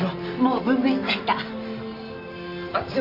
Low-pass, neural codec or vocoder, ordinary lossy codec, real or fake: 5.4 kHz; none; none; real